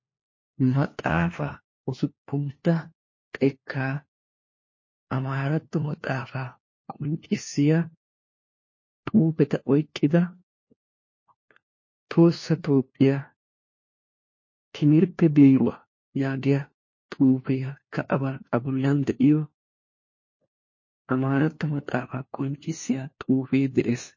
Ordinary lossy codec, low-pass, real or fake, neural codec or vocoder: MP3, 32 kbps; 7.2 kHz; fake; codec, 16 kHz, 1 kbps, FunCodec, trained on LibriTTS, 50 frames a second